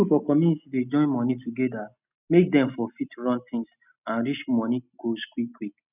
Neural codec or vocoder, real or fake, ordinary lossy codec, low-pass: none; real; none; 3.6 kHz